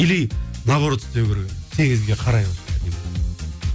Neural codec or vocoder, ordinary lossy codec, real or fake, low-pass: none; none; real; none